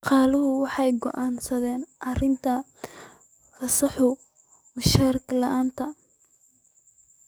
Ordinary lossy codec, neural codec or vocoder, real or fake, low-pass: none; codec, 44.1 kHz, 7.8 kbps, DAC; fake; none